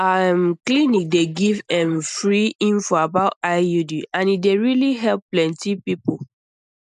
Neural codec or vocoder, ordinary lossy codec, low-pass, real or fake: none; none; 10.8 kHz; real